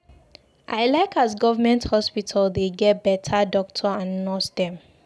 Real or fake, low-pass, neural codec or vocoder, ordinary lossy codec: real; none; none; none